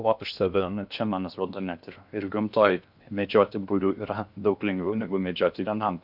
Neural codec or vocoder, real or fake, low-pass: codec, 16 kHz in and 24 kHz out, 0.6 kbps, FocalCodec, streaming, 2048 codes; fake; 5.4 kHz